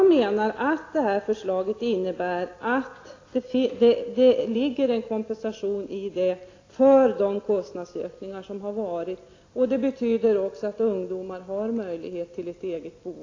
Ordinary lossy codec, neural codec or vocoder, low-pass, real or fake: AAC, 32 kbps; none; 7.2 kHz; real